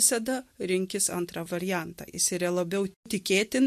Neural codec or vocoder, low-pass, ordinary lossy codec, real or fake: none; 14.4 kHz; MP3, 64 kbps; real